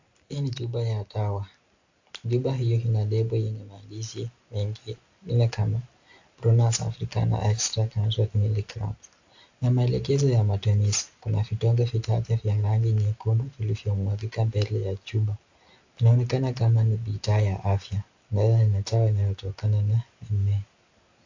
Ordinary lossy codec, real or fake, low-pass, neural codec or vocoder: AAC, 48 kbps; real; 7.2 kHz; none